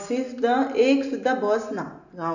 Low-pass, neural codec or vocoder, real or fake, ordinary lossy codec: 7.2 kHz; none; real; none